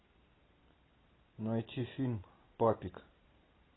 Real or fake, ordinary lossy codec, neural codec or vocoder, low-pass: real; AAC, 16 kbps; none; 7.2 kHz